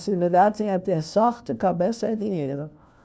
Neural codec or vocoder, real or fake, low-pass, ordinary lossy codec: codec, 16 kHz, 1 kbps, FunCodec, trained on LibriTTS, 50 frames a second; fake; none; none